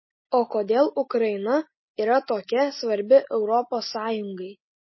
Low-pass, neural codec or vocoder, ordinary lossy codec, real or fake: 7.2 kHz; none; MP3, 24 kbps; real